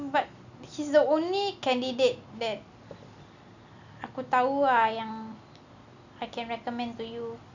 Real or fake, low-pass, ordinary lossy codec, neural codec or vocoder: real; 7.2 kHz; none; none